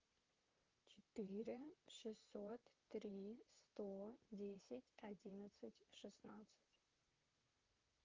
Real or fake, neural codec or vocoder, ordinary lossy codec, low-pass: fake; vocoder, 44.1 kHz, 128 mel bands, Pupu-Vocoder; Opus, 32 kbps; 7.2 kHz